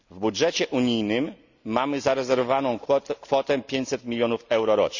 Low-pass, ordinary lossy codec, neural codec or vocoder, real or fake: 7.2 kHz; none; none; real